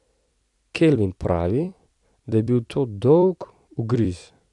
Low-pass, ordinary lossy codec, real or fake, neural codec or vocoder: 10.8 kHz; AAC, 64 kbps; fake; vocoder, 44.1 kHz, 128 mel bands every 256 samples, BigVGAN v2